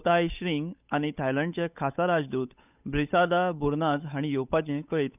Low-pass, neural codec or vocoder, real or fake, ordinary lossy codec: 3.6 kHz; codec, 16 kHz, 8 kbps, FunCodec, trained on Chinese and English, 25 frames a second; fake; none